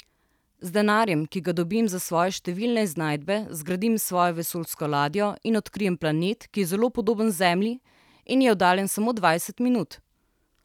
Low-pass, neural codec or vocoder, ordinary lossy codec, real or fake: 19.8 kHz; none; none; real